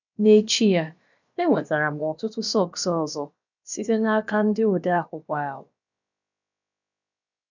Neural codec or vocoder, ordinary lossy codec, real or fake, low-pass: codec, 16 kHz, about 1 kbps, DyCAST, with the encoder's durations; none; fake; 7.2 kHz